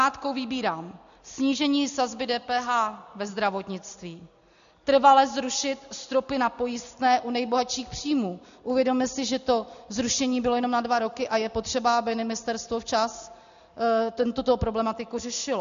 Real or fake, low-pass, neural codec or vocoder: real; 7.2 kHz; none